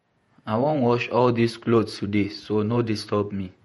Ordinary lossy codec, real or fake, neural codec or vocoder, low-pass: MP3, 48 kbps; fake; vocoder, 48 kHz, 128 mel bands, Vocos; 19.8 kHz